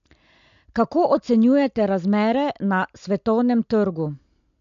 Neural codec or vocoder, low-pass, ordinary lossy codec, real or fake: none; 7.2 kHz; MP3, 64 kbps; real